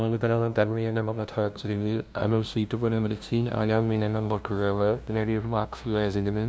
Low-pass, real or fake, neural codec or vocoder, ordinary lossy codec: none; fake; codec, 16 kHz, 0.5 kbps, FunCodec, trained on LibriTTS, 25 frames a second; none